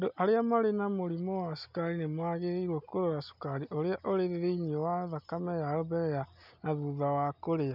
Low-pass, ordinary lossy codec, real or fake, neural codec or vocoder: 5.4 kHz; none; real; none